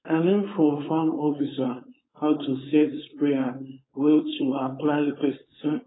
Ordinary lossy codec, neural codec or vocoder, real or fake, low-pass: AAC, 16 kbps; codec, 16 kHz, 4.8 kbps, FACodec; fake; 7.2 kHz